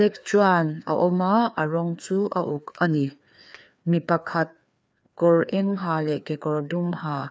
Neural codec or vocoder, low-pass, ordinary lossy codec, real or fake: codec, 16 kHz, 2 kbps, FreqCodec, larger model; none; none; fake